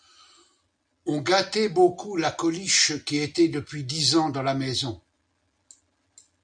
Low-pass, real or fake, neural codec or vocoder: 9.9 kHz; real; none